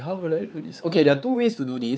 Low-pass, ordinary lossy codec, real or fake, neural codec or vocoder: none; none; fake; codec, 16 kHz, 4 kbps, X-Codec, HuBERT features, trained on LibriSpeech